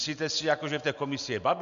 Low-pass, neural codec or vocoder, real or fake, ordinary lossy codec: 7.2 kHz; none; real; AAC, 96 kbps